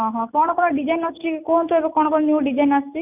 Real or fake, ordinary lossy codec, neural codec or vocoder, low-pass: real; none; none; 3.6 kHz